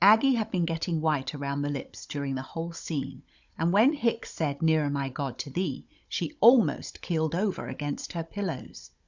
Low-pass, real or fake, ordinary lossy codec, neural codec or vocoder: 7.2 kHz; fake; Opus, 64 kbps; codec, 16 kHz, 16 kbps, FunCodec, trained on Chinese and English, 50 frames a second